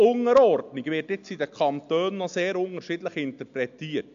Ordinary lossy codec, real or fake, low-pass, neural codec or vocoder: MP3, 64 kbps; real; 7.2 kHz; none